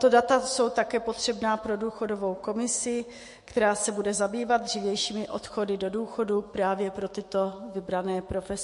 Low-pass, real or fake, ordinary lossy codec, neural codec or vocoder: 14.4 kHz; fake; MP3, 48 kbps; autoencoder, 48 kHz, 128 numbers a frame, DAC-VAE, trained on Japanese speech